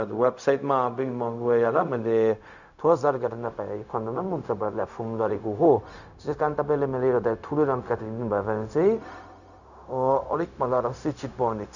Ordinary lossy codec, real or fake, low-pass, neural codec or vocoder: none; fake; 7.2 kHz; codec, 16 kHz, 0.4 kbps, LongCat-Audio-Codec